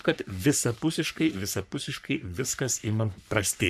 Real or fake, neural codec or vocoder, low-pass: fake; codec, 44.1 kHz, 3.4 kbps, Pupu-Codec; 14.4 kHz